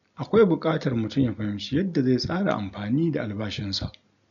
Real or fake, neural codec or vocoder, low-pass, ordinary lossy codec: real; none; 7.2 kHz; none